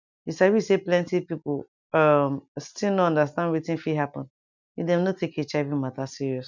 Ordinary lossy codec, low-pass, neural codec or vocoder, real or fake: none; 7.2 kHz; none; real